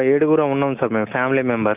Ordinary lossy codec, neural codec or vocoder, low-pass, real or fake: AAC, 32 kbps; none; 3.6 kHz; real